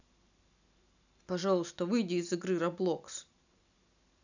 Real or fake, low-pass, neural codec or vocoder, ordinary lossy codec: real; 7.2 kHz; none; none